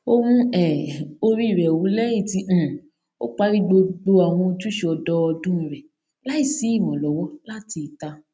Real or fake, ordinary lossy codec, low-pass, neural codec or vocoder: real; none; none; none